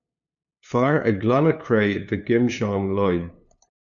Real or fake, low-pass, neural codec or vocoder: fake; 7.2 kHz; codec, 16 kHz, 2 kbps, FunCodec, trained on LibriTTS, 25 frames a second